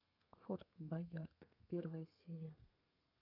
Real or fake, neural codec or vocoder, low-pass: fake; codec, 32 kHz, 1.9 kbps, SNAC; 5.4 kHz